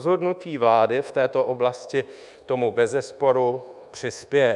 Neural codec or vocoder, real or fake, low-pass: codec, 24 kHz, 1.2 kbps, DualCodec; fake; 10.8 kHz